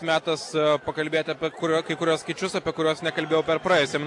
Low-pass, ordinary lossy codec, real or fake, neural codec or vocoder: 10.8 kHz; AAC, 48 kbps; real; none